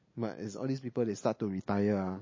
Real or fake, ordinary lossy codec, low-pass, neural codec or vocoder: real; MP3, 32 kbps; 7.2 kHz; none